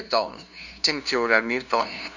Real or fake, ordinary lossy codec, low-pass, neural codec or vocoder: fake; none; 7.2 kHz; codec, 16 kHz, 0.5 kbps, FunCodec, trained on LibriTTS, 25 frames a second